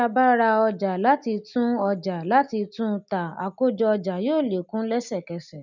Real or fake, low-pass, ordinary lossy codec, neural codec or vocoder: real; 7.2 kHz; none; none